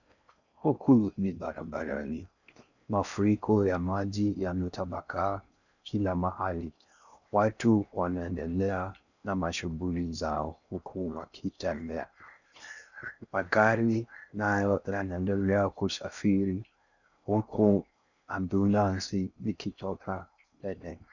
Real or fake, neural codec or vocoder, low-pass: fake; codec, 16 kHz in and 24 kHz out, 0.6 kbps, FocalCodec, streaming, 4096 codes; 7.2 kHz